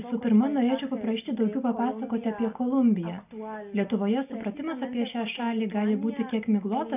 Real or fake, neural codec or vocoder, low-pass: real; none; 3.6 kHz